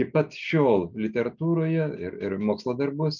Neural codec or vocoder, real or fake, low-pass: none; real; 7.2 kHz